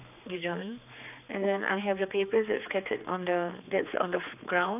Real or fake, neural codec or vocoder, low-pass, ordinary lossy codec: fake; codec, 16 kHz, 4 kbps, X-Codec, HuBERT features, trained on general audio; 3.6 kHz; none